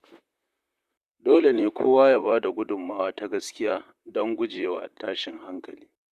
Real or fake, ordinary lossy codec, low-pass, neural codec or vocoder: fake; Opus, 64 kbps; 14.4 kHz; vocoder, 44.1 kHz, 128 mel bands, Pupu-Vocoder